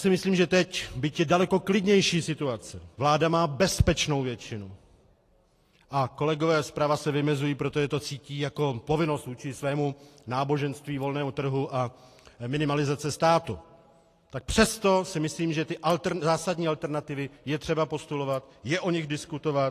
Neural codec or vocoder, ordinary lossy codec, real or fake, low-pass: none; AAC, 48 kbps; real; 14.4 kHz